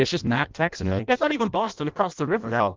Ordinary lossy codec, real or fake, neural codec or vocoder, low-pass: Opus, 24 kbps; fake; codec, 16 kHz in and 24 kHz out, 0.6 kbps, FireRedTTS-2 codec; 7.2 kHz